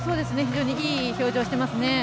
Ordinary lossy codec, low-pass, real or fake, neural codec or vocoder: none; none; real; none